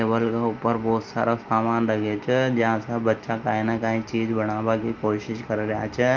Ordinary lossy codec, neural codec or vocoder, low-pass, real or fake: Opus, 32 kbps; none; 7.2 kHz; real